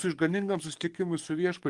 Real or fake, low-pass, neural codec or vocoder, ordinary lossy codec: fake; 10.8 kHz; codec, 44.1 kHz, 7.8 kbps, DAC; Opus, 24 kbps